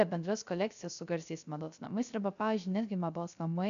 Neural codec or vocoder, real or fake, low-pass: codec, 16 kHz, 0.3 kbps, FocalCodec; fake; 7.2 kHz